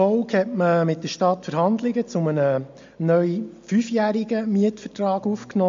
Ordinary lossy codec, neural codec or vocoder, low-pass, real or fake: MP3, 48 kbps; none; 7.2 kHz; real